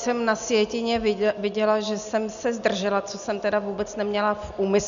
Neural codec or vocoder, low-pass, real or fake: none; 7.2 kHz; real